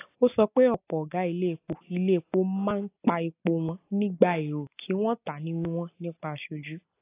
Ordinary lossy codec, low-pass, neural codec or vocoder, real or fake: AAC, 24 kbps; 3.6 kHz; none; real